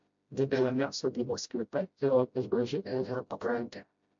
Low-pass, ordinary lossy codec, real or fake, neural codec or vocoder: 7.2 kHz; MP3, 48 kbps; fake; codec, 16 kHz, 0.5 kbps, FreqCodec, smaller model